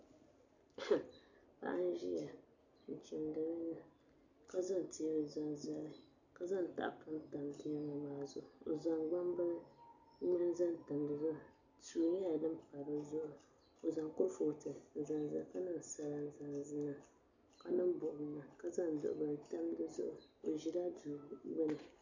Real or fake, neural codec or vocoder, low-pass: real; none; 7.2 kHz